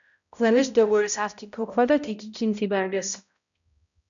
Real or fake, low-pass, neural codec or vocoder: fake; 7.2 kHz; codec, 16 kHz, 0.5 kbps, X-Codec, HuBERT features, trained on balanced general audio